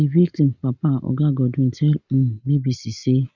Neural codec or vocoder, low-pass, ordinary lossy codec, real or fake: none; 7.2 kHz; none; real